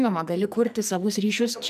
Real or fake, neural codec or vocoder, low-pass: fake; codec, 44.1 kHz, 2.6 kbps, SNAC; 14.4 kHz